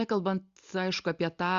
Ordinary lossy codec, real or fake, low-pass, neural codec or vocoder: Opus, 64 kbps; real; 7.2 kHz; none